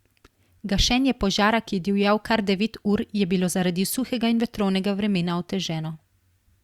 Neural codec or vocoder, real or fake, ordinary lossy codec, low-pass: none; real; Opus, 64 kbps; 19.8 kHz